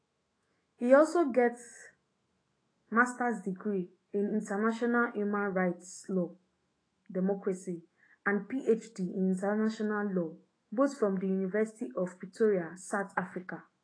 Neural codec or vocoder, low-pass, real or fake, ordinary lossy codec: autoencoder, 48 kHz, 128 numbers a frame, DAC-VAE, trained on Japanese speech; 9.9 kHz; fake; AAC, 32 kbps